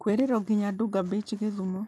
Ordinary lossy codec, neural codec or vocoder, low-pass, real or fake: none; none; none; real